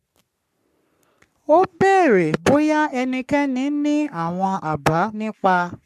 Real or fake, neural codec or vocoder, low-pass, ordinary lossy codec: fake; codec, 44.1 kHz, 3.4 kbps, Pupu-Codec; 14.4 kHz; MP3, 96 kbps